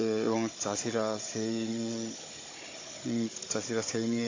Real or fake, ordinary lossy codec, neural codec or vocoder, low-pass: fake; AAC, 32 kbps; codec, 16 kHz, 16 kbps, FunCodec, trained on Chinese and English, 50 frames a second; 7.2 kHz